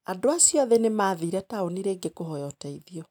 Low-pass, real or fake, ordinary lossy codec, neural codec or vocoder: 19.8 kHz; real; none; none